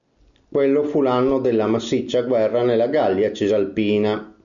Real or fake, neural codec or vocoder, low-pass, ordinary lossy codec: real; none; 7.2 kHz; MP3, 96 kbps